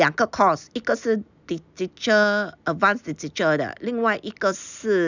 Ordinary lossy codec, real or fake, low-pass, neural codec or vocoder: none; real; 7.2 kHz; none